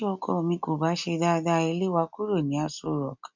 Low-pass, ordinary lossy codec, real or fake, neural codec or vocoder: 7.2 kHz; none; real; none